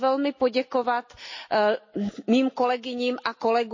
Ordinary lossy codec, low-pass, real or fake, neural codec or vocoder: MP3, 32 kbps; 7.2 kHz; real; none